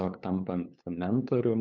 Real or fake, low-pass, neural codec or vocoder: fake; 7.2 kHz; codec, 16 kHz, 8 kbps, FunCodec, trained on LibriTTS, 25 frames a second